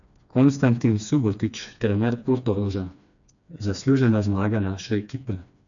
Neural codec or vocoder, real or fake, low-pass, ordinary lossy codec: codec, 16 kHz, 2 kbps, FreqCodec, smaller model; fake; 7.2 kHz; none